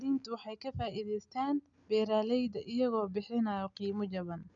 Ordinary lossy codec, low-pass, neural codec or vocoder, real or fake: none; 7.2 kHz; none; real